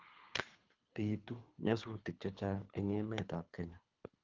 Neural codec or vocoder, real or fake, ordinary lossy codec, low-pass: codec, 24 kHz, 3 kbps, HILCodec; fake; Opus, 32 kbps; 7.2 kHz